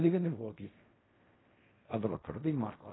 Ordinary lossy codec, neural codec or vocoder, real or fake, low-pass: AAC, 16 kbps; codec, 16 kHz in and 24 kHz out, 0.4 kbps, LongCat-Audio-Codec, fine tuned four codebook decoder; fake; 7.2 kHz